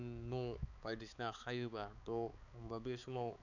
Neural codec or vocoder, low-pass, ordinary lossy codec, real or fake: codec, 16 kHz, 4 kbps, X-Codec, HuBERT features, trained on balanced general audio; 7.2 kHz; none; fake